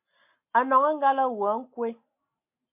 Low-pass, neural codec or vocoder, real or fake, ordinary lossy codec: 3.6 kHz; none; real; MP3, 32 kbps